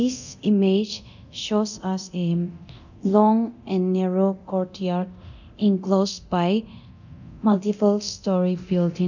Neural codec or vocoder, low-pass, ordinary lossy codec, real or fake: codec, 24 kHz, 0.9 kbps, DualCodec; 7.2 kHz; none; fake